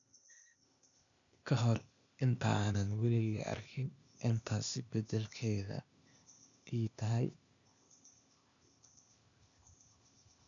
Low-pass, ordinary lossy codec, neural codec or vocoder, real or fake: 7.2 kHz; none; codec, 16 kHz, 0.8 kbps, ZipCodec; fake